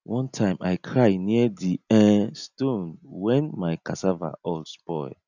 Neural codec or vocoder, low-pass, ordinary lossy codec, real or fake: none; 7.2 kHz; none; real